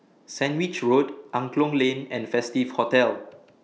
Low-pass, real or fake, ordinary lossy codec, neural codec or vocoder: none; real; none; none